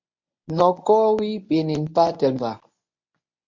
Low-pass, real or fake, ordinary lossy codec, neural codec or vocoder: 7.2 kHz; fake; MP3, 48 kbps; codec, 24 kHz, 0.9 kbps, WavTokenizer, medium speech release version 1